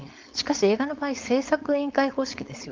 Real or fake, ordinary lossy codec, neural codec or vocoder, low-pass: fake; Opus, 32 kbps; codec, 16 kHz, 4.8 kbps, FACodec; 7.2 kHz